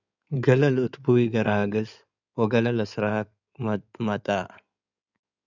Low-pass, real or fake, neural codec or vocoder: 7.2 kHz; fake; codec, 16 kHz in and 24 kHz out, 2.2 kbps, FireRedTTS-2 codec